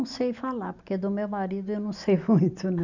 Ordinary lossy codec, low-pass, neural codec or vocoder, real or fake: none; 7.2 kHz; none; real